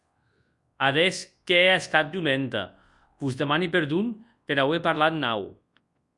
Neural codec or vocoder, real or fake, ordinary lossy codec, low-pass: codec, 24 kHz, 0.9 kbps, WavTokenizer, large speech release; fake; Opus, 64 kbps; 10.8 kHz